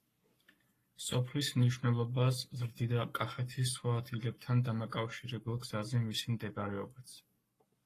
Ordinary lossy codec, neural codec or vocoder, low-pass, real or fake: AAC, 48 kbps; codec, 44.1 kHz, 7.8 kbps, Pupu-Codec; 14.4 kHz; fake